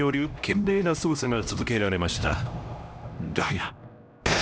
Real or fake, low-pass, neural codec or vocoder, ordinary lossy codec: fake; none; codec, 16 kHz, 1 kbps, X-Codec, HuBERT features, trained on LibriSpeech; none